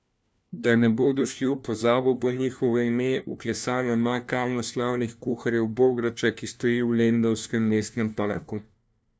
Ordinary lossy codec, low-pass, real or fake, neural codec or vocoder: none; none; fake; codec, 16 kHz, 1 kbps, FunCodec, trained on LibriTTS, 50 frames a second